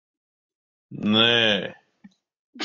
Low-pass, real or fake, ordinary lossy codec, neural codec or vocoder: 7.2 kHz; real; MP3, 48 kbps; none